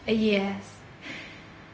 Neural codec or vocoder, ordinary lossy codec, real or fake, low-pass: codec, 16 kHz, 0.4 kbps, LongCat-Audio-Codec; none; fake; none